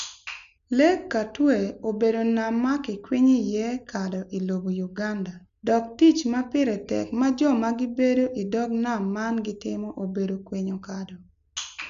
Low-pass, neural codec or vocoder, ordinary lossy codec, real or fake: 7.2 kHz; none; none; real